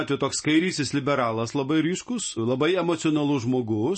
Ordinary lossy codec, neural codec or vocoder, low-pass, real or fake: MP3, 32 kbps; none; 9.9 kHz; real